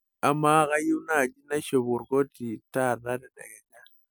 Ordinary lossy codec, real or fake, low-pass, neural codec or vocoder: none; real; none; none